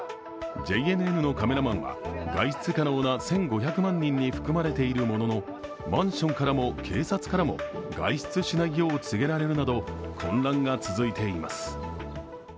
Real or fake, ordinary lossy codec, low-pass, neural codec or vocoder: real; none; none; none